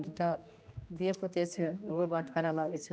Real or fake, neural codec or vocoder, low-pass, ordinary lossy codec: fake; codec, 16 kHz, 1 kbps, X-Codec, HuBERT features, trained on general audio; none; none